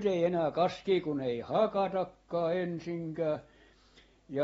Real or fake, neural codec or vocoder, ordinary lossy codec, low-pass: real; none; AAC, 24 kbps; 19.8 kHz